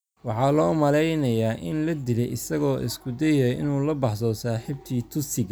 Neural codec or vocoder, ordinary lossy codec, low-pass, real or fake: none; none; none; real